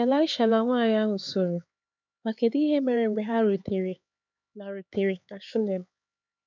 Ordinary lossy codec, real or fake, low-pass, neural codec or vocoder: none; fake; 7.2 kHz; codec, 16 kHz, 4 kbps, X-Codec, HuBERT features, trained on LibriSpeech